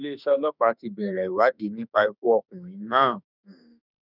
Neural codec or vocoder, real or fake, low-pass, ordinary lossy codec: autoencoder, 48 kHz, 32 numbers a frame, DAC-VAE, trained on Japanese speech; fake; 5.4 kHz; none